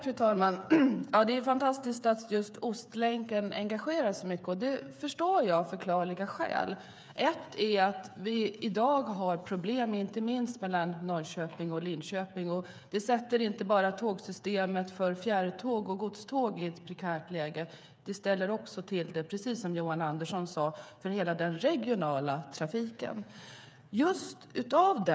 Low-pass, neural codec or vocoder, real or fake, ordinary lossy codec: none; codec, 16 kHz, 8 kbps, FreqCodec, smaller model; fake; none